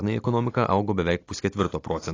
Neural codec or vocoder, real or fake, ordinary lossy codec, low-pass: none; real; AAC, 32 kbps; 7.2 kHz